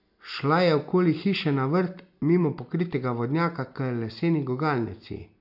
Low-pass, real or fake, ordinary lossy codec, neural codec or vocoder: 5.4 kHz; real; none; none